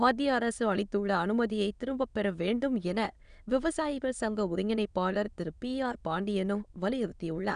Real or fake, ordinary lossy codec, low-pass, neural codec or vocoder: fake; none; 9.9 kHz; autoencoder, 22.05 kHz, a latent of 192 numbers a frame, VITS, trained on many speakers